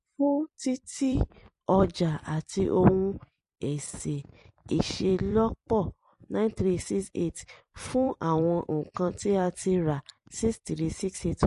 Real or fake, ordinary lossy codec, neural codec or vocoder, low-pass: fake; MP3, 48 kbps; vocoder, 44.1 kHz, 128 mel bands every 256 samples, BigVGAN v2; 14.4 kHz